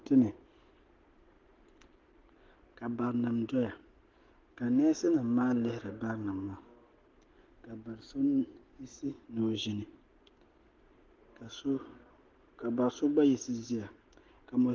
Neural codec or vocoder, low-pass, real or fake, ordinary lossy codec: none; 7.2 kHz; real; Opus, 16 kbps